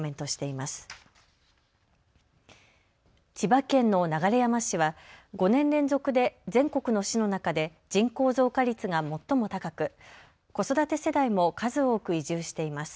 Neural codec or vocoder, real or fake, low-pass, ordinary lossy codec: none; real; none; none